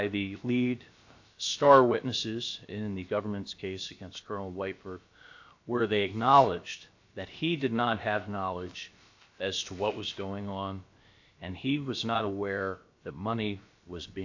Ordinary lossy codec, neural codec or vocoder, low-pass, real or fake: AAC, 48 kbps; codec, 16 kHz, about 1 kbps, DyCAST, with the encoder's durations; 7.2 kHz; fake